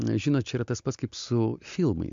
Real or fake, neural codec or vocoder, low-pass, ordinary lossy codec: real; none; 7.2 kHz; MP3, 64 kbps